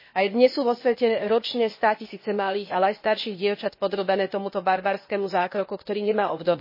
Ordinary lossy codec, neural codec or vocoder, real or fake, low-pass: MP3, 24 kbps; codec, 16 kHz, 0.8 kbps, ZipCodec; fake; 5.4 kHz